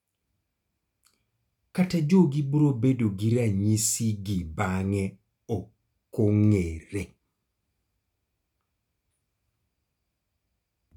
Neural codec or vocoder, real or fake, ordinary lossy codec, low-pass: none; real; none; 19.8 kHz